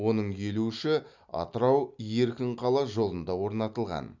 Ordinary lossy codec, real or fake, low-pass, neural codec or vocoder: none; real; 7.2 kHz; none